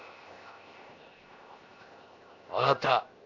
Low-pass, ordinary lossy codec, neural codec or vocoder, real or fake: 7.2 kHz; MP3, 64 kbps; codec, 16 kHz, 0.7 kbps, FocalCodec; fake